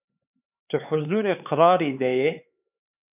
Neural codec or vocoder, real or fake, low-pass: codec, 16 kHz, 4 kbps, X-Codec, HuBERT features, trained on LibriSpeech; fake; 3.6 kHz